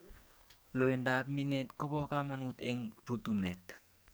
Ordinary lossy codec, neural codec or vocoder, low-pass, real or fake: none; codec, 44.1 kHz, 2.6 kbps, SNAC; none; fake